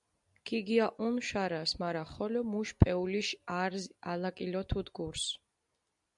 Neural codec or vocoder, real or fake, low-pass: none; real; 10.8 kHz